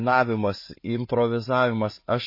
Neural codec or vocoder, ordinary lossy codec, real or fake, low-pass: none; MP3, 24 kbps; real; 5.4 kHz